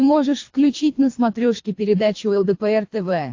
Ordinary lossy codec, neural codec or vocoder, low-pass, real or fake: AAC, 48 kbps; codec, 24 kHz, 3 kbps, HILCodec; 7.2 kHz; fake